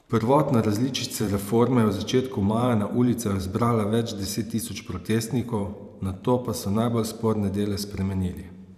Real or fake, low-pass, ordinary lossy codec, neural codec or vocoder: fake; 14.4 kHz; none; vocoder, 44.1 kHz, 128 mel bands every 512 samples, BigVGAN v2